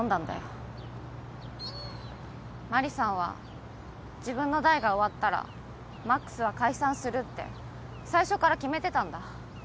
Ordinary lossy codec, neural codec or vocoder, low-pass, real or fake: none; none; none; real